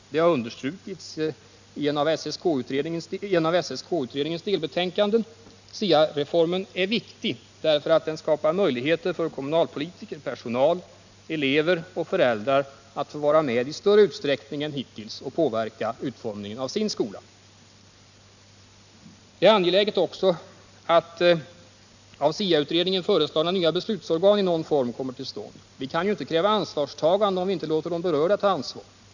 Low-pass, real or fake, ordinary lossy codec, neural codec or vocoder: 7.2 kHz; real; none; none